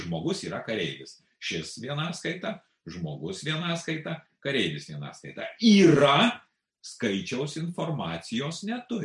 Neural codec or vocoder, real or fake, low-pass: none; real; 10.8 kHz